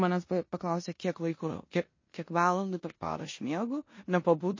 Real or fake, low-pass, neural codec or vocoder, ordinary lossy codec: fake; 7.2 kHz; codec, 16 kHz in and 24 kHz out, 0.9 kbps, LongCat-Audio-Codec, four codebook decoder; MP3, 32 kbps